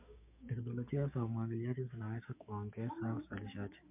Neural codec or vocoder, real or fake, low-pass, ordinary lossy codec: codec, 16 kHz, 6 kbps, DAC; fake; 3.6 kHz; MP3, 32 kbps